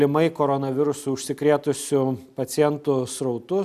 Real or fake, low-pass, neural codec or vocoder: real; 14.4 kHz; none